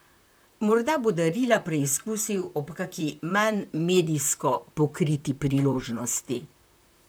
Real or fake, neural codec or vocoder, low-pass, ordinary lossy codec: fake; vocoder, 44.1 kHz, 128 mel bands, Pupu-Vocoder; none; none